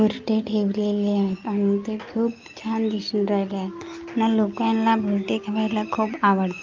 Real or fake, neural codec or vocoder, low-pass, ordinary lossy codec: real; none; 7.2 kHz; Opus, 24 kbps